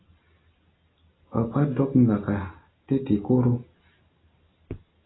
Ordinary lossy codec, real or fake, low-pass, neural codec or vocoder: AAC, 16 kbps; real; 7.2 kHz; none